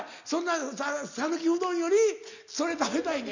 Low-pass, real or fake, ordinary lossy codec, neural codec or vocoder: 7.2 kHz; real; none; none